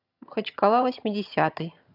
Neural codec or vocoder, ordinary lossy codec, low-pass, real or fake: vocoder, 22.05 kHz, 80 mel bands, HiFi-GAN; none; 5.4 kHz; fake